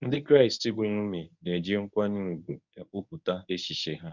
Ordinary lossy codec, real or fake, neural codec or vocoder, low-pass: none; fake; codec, 24 kHz, 0.9 kbps, WavTokenizer, medium speech release version 1; 7.2 kHz